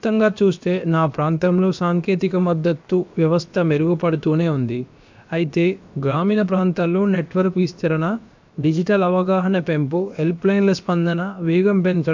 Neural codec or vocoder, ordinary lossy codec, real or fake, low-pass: codec, 16 kHz, about 1 kbps, DyCAST, with the encoder's durations; MP3, 64 kbps; fake; 7.2 kHz